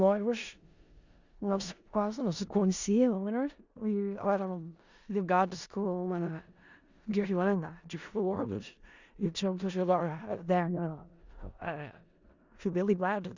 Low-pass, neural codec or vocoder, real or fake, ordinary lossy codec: 7.2 kHz; codec, 16 kHz in and 24 kHz out, 0.4 kbps, LongCat-Audio-Codec, four codebook decoder; fake; Opus, 64 kbps